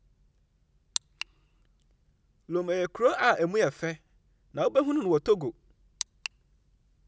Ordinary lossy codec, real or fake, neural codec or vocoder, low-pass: none; real; none; none